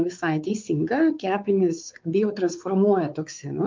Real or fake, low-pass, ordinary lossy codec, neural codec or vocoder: fake; 7.2 kHz; Opus, 32 kbps; codec, 16 kHz, 4 kbps, X-Codec, WavLM features, trained on Multilingual LibriSpeech